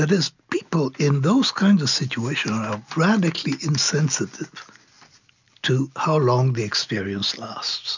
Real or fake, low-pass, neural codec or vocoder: real; 7.2 kHz; none